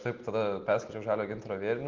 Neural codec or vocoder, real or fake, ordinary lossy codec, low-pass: none; real; Opus, 16 kbps; 7.2 kHz